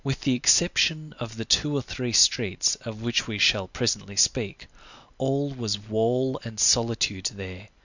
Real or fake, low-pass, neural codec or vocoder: real; 7.2 kHz; none